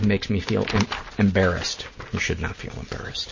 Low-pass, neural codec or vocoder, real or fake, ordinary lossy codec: 7.2 kHz; none; real; MP3, 32 kbps